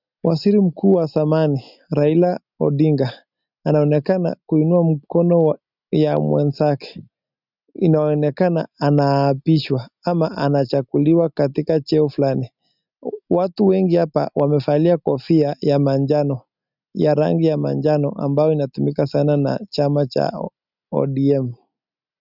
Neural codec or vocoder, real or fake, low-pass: none; real; 5.4 kHz